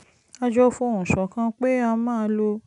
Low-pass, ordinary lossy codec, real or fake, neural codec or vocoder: 10.8 kHz; none; real; none